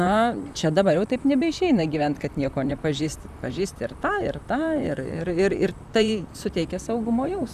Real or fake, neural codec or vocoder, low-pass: fake; vocoder, 44.1 kHz, 128 mel bands, Pupu-Vocoder; 14.4 kHz